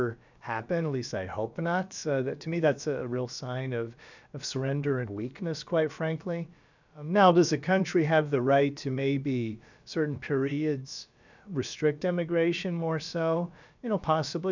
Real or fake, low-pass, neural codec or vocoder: fake; 7.2 kHz; codec, 16 kHz, about 1 kbps, DyCAST, with the encoder's durations